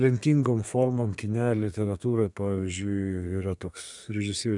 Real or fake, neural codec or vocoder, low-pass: fake; codec, 32 kHz, 1.9 kbps, SNAC; 10.8 kHz